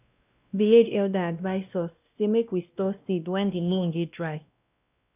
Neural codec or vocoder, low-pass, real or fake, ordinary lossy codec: codec, 16 kHz, 1 kbps, X-Codec, WavLM features, trained on Multilingual LibriSpeech; 3.6 kHz; fake; none